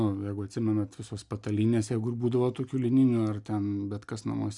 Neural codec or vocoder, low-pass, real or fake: none; 10.8 kHz; real